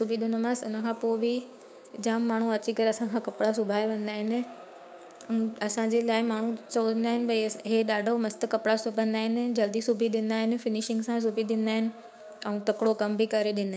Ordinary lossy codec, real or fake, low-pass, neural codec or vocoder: none; fake; none; codec, 16 kHz, 6 kbps, DAC